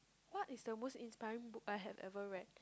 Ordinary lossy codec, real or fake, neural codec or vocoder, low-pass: none; real; none; none